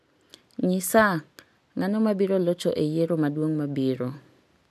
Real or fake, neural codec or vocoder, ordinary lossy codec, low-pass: real; none; none; 14.4 kHz